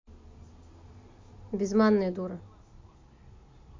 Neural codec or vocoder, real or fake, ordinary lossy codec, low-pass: none; real; MP3, 48 kbps; 7.2 kHz